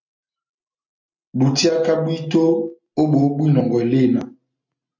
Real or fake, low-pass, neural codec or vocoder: real; 7.2 kHz; none